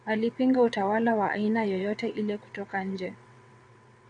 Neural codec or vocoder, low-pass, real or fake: vocoder, 22.05 kHz, 80 mel bands, WaveNeXt; 9.9 kHz; fake